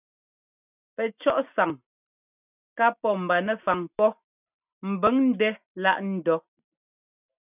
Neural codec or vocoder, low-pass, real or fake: none; 3.6 kHz; real